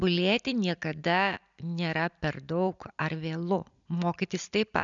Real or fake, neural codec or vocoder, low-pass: real; none; 7.2 kHz